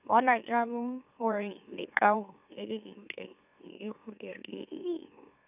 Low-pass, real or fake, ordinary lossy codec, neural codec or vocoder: 3.6 kHz; fake; none; autoencoder, 44.1 kHz, a latent of 192 numbers a frame, MeloTTS